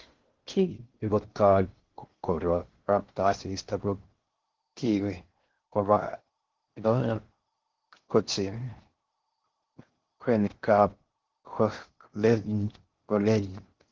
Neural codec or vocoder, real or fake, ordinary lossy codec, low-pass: codec, 16 kHz in and 24 kHz out, 0.6 kbps, FocalCodec, streaming, 2048 codes; fake; Opus, 16 kbps; 7.2 kHz